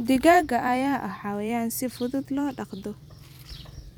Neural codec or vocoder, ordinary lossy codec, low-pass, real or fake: vocoder, 44.1 kHz, 128 mel bands every 256 samples, BigVGAN v2; none; none; fake